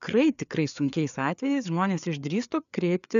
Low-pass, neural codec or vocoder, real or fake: 7.2 kHz; codec, 16 kHz, 4 kbps, FreqCodec, larger model; fake